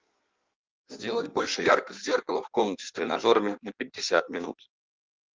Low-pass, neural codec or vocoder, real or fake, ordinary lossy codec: 7.2 kHz; codec, 16 kHz in and 24 kHz out, 1.1 kbps, FireRedTTS-2 codec; fake; Opus, 32 kbps